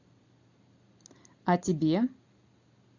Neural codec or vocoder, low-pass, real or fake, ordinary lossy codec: none; 7.2 kHz; real; AAC, 48 kbps